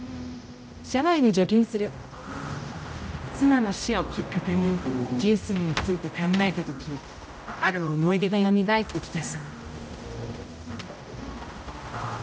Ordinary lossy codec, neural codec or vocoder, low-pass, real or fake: none; codec, 16 kHz, 0.5 kbps, X-Codec, HuBERT features, trained on general audio; none; fake